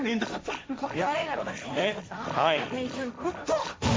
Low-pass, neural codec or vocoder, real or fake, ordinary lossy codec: none; codec, 16 kHz, 1.1 kbps, Voila-Tokenizer; fake; none